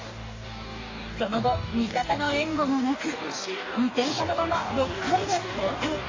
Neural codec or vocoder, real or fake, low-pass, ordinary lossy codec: codec, 44.1 kHz, 2.6 kbps, DAC; fake; 7.2 kHz; AAC, 32 kbps